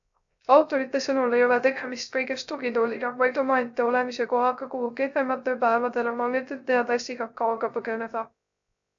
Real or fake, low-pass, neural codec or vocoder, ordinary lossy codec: fake; 7.2 kHz; codec, 16 kHz, 0.3 kbps, FocalCodec; AAC, 64 kbps